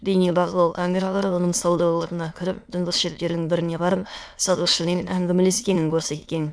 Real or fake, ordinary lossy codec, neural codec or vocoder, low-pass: fake; none; autoencoder, 22.05 kHz, a latent of 192 numbers a frame, VITS, trained on many speakers; none